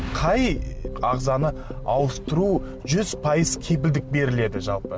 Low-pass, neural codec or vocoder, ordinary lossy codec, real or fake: none; none; none; real